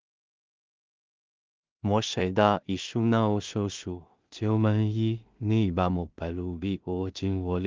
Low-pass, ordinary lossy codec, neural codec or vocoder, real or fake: 7.2 kHz; Opus, 32 kbps; codec, 16 kHz in and 24 kHz out, 0.4 kbps, LongCat-Audio-Codec, two codebook decoder; fake